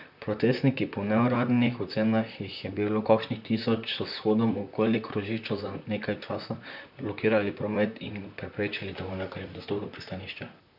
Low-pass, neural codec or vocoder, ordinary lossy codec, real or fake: 5.4 kHz; vocoder, 44.1 kHz, 128 mel bands, Pupu-Vocoder; none; fake